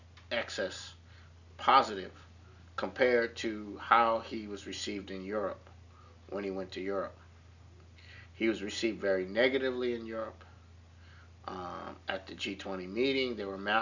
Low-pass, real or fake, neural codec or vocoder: 7.2 kHz; real; none